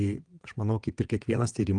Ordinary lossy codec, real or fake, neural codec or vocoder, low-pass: Opus, 24 kbps; fake; vocoder, 22.05 kHz, 80 mel bands, WaveNeXt; 9.9 kHz